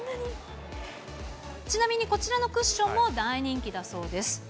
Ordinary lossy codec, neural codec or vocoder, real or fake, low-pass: none; none; real; none